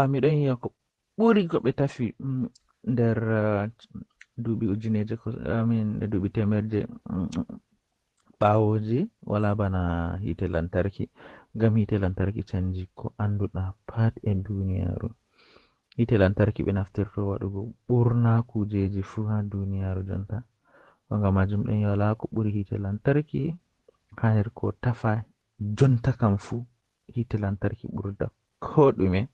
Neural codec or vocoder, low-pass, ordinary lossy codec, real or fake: vocoder, 48 kHz, 128 mel bands, Vocos; 19.8 kHz; Opus, 16 kbps; fake